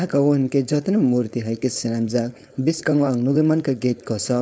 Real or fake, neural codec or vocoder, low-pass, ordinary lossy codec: fake; codec, 16 kHz, 4.8 kbps, FACodec; none; none